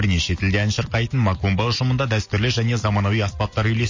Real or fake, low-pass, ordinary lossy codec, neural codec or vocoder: real; 7.2 kHz; MP3, 32 kbps; none